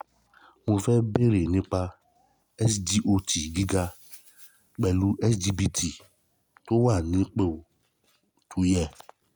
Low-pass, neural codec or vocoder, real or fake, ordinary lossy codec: none; none; real; none